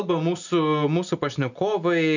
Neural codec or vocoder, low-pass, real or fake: none; 7.2 kHz; real